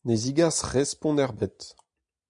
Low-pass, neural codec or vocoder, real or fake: 9.9 kHz; none; real